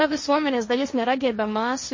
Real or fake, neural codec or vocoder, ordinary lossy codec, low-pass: fake; codec, 16 kHz, 1.1 kbps, Voila-Tokenizer; MP3, 32 kbps; 7.2 kHz